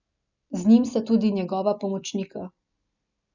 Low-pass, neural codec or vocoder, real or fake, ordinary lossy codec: 7.2 kHz; none; real; none